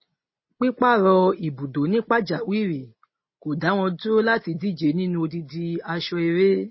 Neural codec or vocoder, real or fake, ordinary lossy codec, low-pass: none; real; MP3, 24 kbps; 7.2 kHz